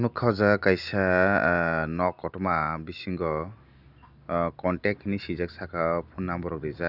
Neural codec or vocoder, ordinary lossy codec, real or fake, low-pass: none; none; real; 5.4 kHz